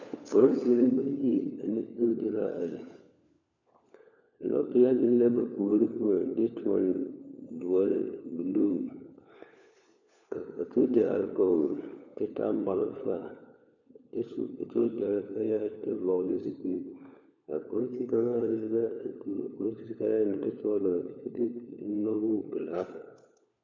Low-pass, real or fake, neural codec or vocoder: 7.2 kHz; fake; codec, 16 kHz, 4 kbps, FunCodec, trained on LibriTTS, 50 frames a second